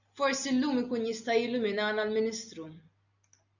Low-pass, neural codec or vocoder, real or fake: 7.2 kHz; none; real